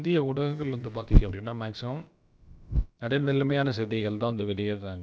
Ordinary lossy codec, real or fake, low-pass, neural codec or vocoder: none; fake; none; codec, 16 kHz, about 1 kbps, DyCAST, with the encoder's durations